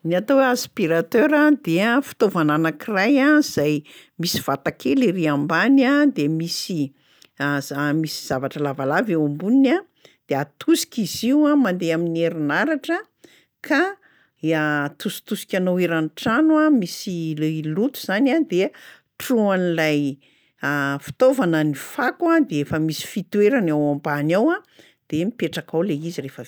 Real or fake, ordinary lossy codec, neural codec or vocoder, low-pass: real; none; none; none